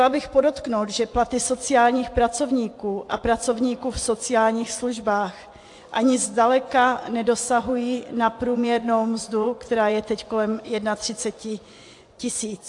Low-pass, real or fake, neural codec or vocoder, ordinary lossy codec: 10.8 kHz; fake; vocoder, 24 kHz, 100 mel bands, Vocos; AAC, 64 kbps